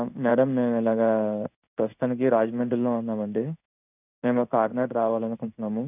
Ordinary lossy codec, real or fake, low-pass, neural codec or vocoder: none; fake; 3.6 kHz; codec, 16 kHz in and 24 kHz out, 1 kbps, XY-Tokenizer